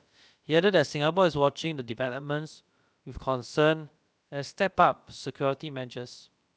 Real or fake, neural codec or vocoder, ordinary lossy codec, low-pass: fake; codec, 16 kHz, about 1 kbps, DyCAST, with the encoder's durations; none; none